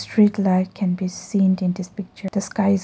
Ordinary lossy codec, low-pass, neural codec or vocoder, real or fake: none; none; none; real